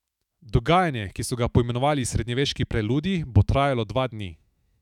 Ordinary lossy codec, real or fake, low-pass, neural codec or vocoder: none; fake; 19.8 kHz; autoencoder, 48 kHz, 128 numbers a frame, DAC-VAE, trained on Japanese speech